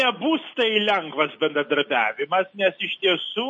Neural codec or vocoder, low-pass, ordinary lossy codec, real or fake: none; 10.8 kHz; MP3, 32 kbps; real